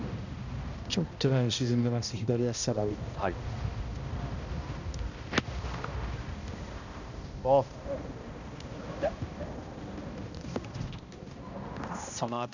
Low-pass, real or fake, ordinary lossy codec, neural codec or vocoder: 7.2 kHz; fake; none; codec, 16 kHz, 1 kbps, X-Codec, HuBERT features, trained on balanced general audio